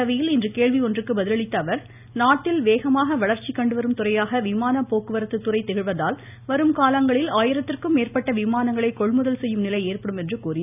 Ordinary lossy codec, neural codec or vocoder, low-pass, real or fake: none; none; 3.6 kHz; real